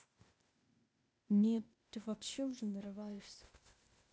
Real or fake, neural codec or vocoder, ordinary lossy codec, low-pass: fake; codec, 16 kHz, 0.8 kbps, ZipCodec; none; none